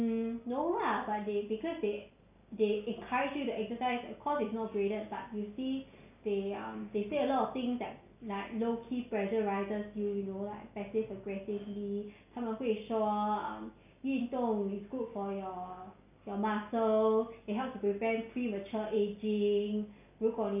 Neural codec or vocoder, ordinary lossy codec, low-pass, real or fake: none; none; 3.6 kHz; real